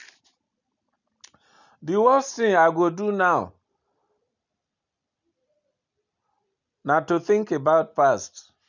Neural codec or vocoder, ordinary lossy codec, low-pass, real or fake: none; none; 7.2 kHz; real